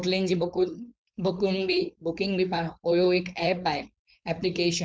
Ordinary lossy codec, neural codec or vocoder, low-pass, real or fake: none; codec, 16 kHz, 4.8 kbps, FACodec; none; fake